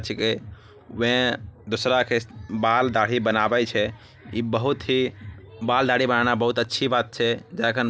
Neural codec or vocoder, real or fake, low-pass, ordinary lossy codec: none; real; none; none